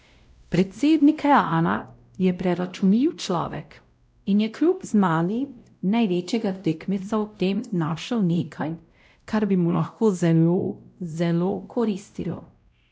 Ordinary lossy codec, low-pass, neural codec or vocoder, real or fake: none; none; codec, 16 kHz, 0.5 kbps, X-Codec, WavLM features, trained on Multilingual LibriSpeech; fake